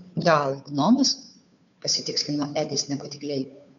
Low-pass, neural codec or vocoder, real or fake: 7.2 kHz; codec, 16 kHz, 2 kbps, FunCodec, trained on Chinese and English, 25 frames a second; fake